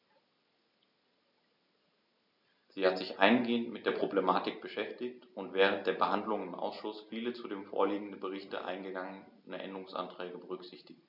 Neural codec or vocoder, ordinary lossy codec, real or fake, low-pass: none; none; real; 5.4 kHz